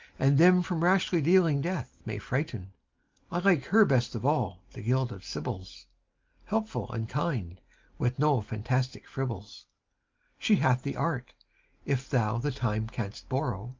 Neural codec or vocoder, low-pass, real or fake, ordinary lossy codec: none; 7.2 kHz; real; Opus, 32 kbps